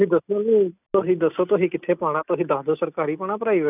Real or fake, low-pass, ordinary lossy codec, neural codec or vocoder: real; 3.6 kHz; none; none